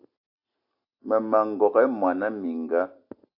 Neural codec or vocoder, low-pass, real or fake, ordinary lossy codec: none; 5.4 kHz; real; AAC, 48 kbps